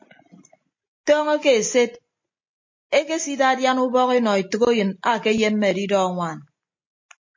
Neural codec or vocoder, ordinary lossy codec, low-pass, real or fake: none; MP3, 32 kbps; 7.2 kHz; real